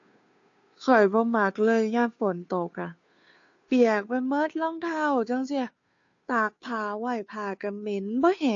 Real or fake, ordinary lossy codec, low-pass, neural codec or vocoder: fake; AAC, 48 kbps; 7.2 kHz; codec, 16 kHz, 2 kbps, FunCodec, trained on Chinese and English, 25 frames a second